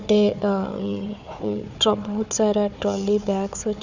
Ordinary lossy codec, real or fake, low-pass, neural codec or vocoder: none; fake; 7.2 kHz; codec, 16 kHz, 4 kbps, FunCodec, trained on Chinese and English, 50 frames a second